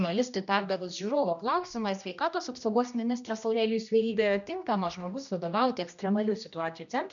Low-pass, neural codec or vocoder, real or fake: 7.2 kHz; codec, 16 kHz, 1 kbps, X-Codec, HuBERT features, trained on general audio; fake